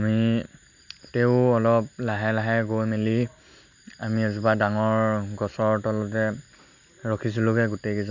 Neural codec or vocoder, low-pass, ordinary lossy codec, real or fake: none; 7.2 kHz; none; real